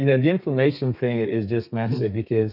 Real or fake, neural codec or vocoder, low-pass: fake; codec, 32 kHz, 1.9 kbps, SNAC; 5.4 kHz